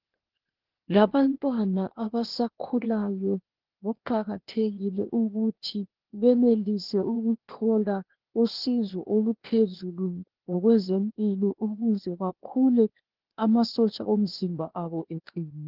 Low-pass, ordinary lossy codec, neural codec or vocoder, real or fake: 5.4 kHz; Opus, 16 kbps; codec, 16 kHz, 0.8 kbps, ZipCodec; fake